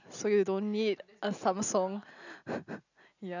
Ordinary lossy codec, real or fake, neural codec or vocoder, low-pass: none; real; none; 7.2 kHz